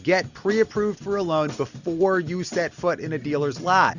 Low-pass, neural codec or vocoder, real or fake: 7.2 kHz; none; real